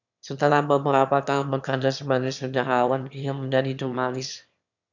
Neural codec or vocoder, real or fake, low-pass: autoencoder, 22.05 kHz, a latent of 192 numbers a frame, VITS, trained on one speaker; fake; 7.2 kHz